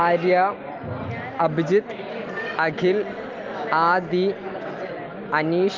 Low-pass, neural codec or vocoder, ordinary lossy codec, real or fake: 7.2 kHz; none; Opus, 32 kbps; real